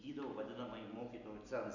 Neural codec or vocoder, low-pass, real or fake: none; 7.2 kHz; real